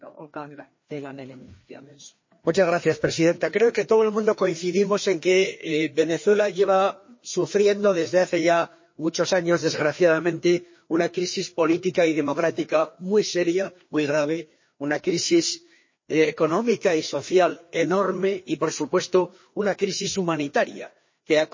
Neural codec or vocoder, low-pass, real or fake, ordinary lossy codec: codec, 16 kHz, 2 kbps, FreqCodec, larger model; 7.2 kHz; fake; MP3, 32 kbps